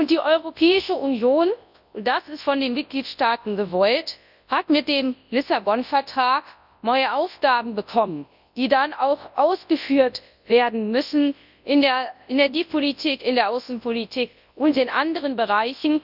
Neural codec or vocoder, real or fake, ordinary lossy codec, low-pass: codec, 24 kHz, 0.9 kbps, WavTokenizer, large speech release; fake; none; 5.4 kHz